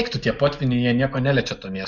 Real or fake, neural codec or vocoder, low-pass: real; none; 7.2 kHz